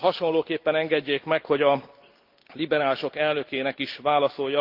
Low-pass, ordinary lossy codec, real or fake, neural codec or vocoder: 5.4 kHz; Opus, 32 kbps; real; none